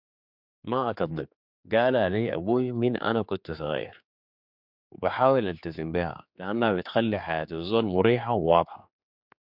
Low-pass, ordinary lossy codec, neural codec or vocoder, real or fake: 5.4 kHz; MP3, 48 kbps; codec, 16 kHz, 4 kbps, X-Codec, HuBERT features, trained on general audio; fake